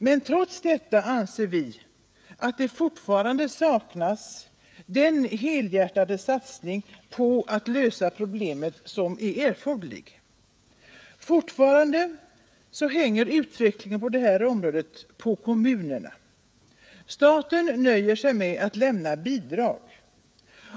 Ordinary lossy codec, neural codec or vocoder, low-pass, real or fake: none; codec, 16 kHz, 16 kbps, FreqCodec, smaller model; none; fake